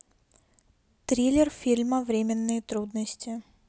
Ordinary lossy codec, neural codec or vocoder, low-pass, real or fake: none; none; none; real